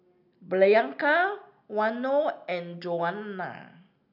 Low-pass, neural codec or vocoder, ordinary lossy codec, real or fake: 5.4 kHz; none; none; real